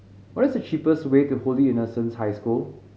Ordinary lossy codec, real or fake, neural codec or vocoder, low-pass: none; real; none; none